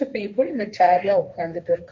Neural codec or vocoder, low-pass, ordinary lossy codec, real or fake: codec, 16 kHz, 1.1 kbps, Voila-Tokenizer; none; none; fake